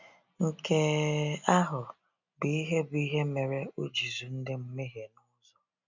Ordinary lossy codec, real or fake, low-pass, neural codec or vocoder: none; real; 7.2 kHz; none